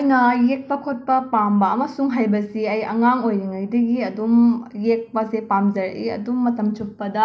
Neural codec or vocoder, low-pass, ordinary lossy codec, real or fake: none; none; none; real